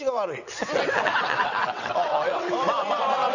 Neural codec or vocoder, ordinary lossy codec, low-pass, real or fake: vocoder, 22.05 kHz, 80 mel bands, WaveNeXt; none; 7.2 kHz; fake